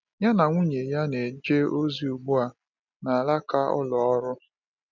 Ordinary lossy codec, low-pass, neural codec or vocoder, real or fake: none; 7.2 kHz; none; real